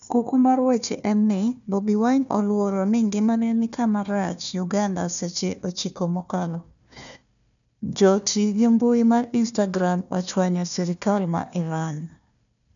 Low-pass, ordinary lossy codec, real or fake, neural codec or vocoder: 7.2 kHz; none; fake; codec, 16 kHz, 1 kbps, FunCodec, trained on Chinese and English, 50 frames a second